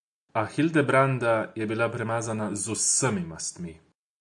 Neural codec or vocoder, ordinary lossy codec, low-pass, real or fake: none; AAC, 64 kbps; 10.8 kHz; real